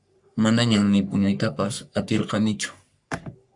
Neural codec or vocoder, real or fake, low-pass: codec, 44.1 kHz, 3.4 kbps, Pupu-Codec; fake; 10.8 kHz